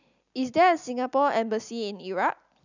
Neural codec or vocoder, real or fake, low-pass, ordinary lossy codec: none; real; 7.2 kHz; none